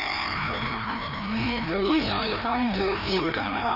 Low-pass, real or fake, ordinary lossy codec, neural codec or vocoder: 5.4 kHz; fake; none; codec, 16 kHz, 1 kbps, FreqCodec, larger model